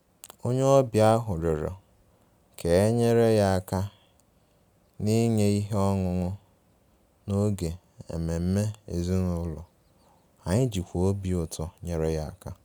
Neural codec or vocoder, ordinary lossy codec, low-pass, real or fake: none; none; none; real